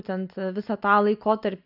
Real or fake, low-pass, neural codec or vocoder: real; 5.4 kHz; none